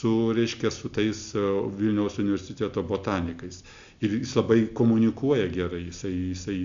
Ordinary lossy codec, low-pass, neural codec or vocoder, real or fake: MP3, 64 kbps; 7.2 kHz; none; real